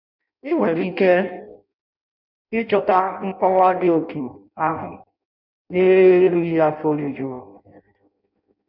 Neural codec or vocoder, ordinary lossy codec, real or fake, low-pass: codec, 16 kHz in and 24 kHz out, 0.6 kbps, FireRedTTS-2 codec; none; fake; 5.4 kHz